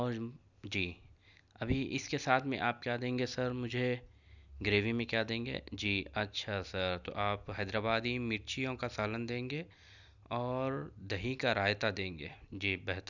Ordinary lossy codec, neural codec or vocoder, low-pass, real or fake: none; none; 7.2 kHz; real